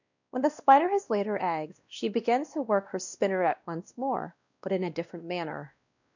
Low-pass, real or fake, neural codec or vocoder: 7.2 kHz; fake; codec, 16 kHz, 1 kbps, X-Codec, WavLM features, trained on Multilingual LibriSpeech